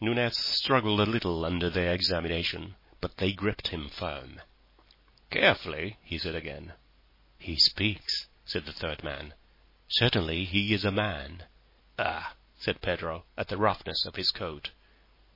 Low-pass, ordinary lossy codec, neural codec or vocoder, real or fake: 5.4 kHz; MP3, 24 kbps; none; real